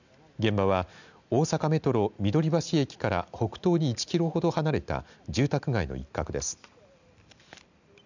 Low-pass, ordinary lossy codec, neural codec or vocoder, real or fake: 7.2 kHz; none; none; real